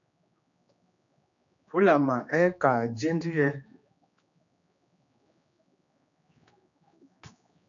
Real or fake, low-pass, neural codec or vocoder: fake; 7.2 kHz; codec, 16 kHz, 2 kbps, X-Codec, HuBERT features, trained on general audio